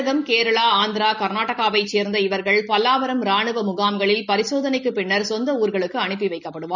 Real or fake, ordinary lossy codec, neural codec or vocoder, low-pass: real; none; none; 7.2 kHz